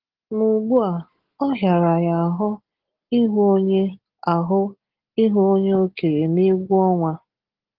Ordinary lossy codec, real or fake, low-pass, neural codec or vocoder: Opus, 16 kbps; real; 5.4 kHz; none